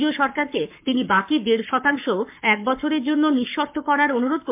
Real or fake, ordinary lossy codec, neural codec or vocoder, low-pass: fake; MP3, 32 kbps; codec, 44.1 kHz, 7.8 kbps, Pupu-Codec; 3.6 kHz